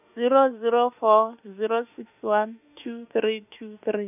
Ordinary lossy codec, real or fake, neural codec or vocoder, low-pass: none; fake; autoencoder, 48 kHz, 32 numbers a frame, DAC-VAE, trained on Japanese speech; 3.6 kHz